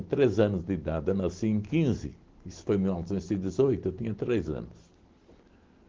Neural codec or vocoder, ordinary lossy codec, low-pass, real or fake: none; Opus, 16 kbps; 7.2 kHz; real